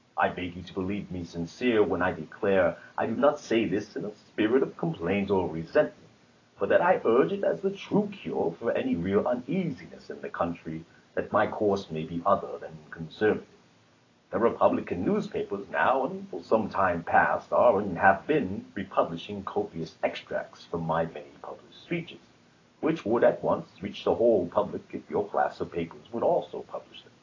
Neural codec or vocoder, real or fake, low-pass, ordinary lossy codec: none; real; 7.2 kHz; AAC, 32 kbps